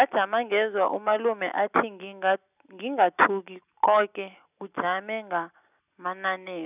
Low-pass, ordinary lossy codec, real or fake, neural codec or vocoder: 3.6 kHz; none; real; none